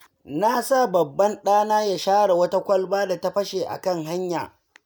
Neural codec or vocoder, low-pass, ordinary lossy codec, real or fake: none; none; none; real